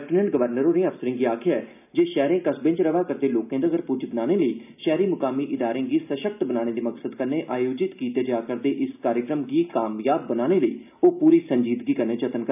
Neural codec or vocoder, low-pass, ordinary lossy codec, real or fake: none; 3.6 kHz; none; real